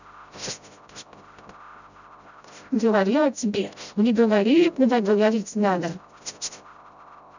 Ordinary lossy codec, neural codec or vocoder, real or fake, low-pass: none; codec, 16 kHz, 0.5 kbps, FreqCodec, smaller model; fake; 7.2 kHz